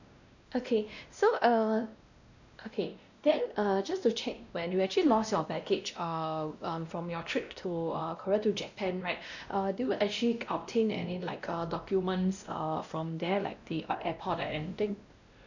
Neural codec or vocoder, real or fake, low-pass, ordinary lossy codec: codec, 16 kHz, 1 kbps, X-Codec, WavLM features, trained on Multilingual LibriSpeech; fake; 7.2 kHz; none